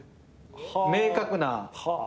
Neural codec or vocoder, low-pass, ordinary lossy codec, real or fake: none; none; none; real